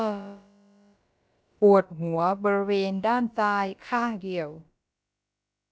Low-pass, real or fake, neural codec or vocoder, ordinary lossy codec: none; fake; codec, 16 kHz, about 1 kbps, DyCAST, with the encoder's durations; none